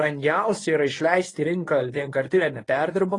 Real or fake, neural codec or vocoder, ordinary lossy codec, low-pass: fake; codec, 24 kHz, 0.9 kbps, WavTokenizer, medium speech release version 1; AAC, 32 kbps; 10.8 kHz